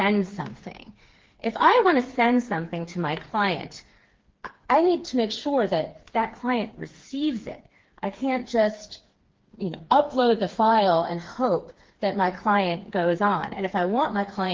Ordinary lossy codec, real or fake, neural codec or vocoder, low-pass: Opus, 16 kbps; fake; codec, 16 kHz, 4 kbps, FreqCodec, smaller model; 7.2 kHz